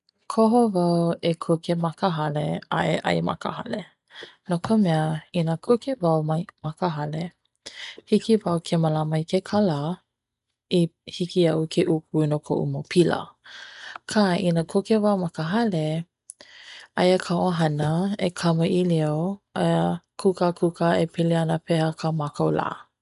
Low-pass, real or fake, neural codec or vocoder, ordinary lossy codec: 10.8 kHz; real; none; none